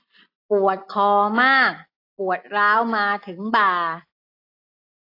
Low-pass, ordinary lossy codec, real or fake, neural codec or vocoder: 5.4 kHz; AAC, 32 kbps; real; none